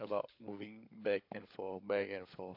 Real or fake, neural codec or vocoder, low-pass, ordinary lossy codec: fake; codec, 16 kHz, 16 kbps, FunCodec, trained on LibriTTS, 50 frames a second; 5.4 kHz; AAC, 32 kbps